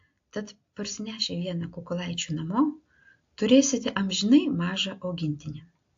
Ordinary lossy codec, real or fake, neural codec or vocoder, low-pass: AAC, 48 kbps; real; none; 7.2 kHz